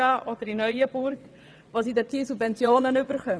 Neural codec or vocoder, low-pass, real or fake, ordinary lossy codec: vocoder, 22.05 kHz, 80 mel bands, WaveNeXt; none; fake; none